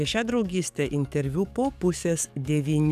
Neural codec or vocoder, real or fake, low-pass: codec, 44.1 kHz, 7.8 kbps, DAC; fake; 14.4 kHz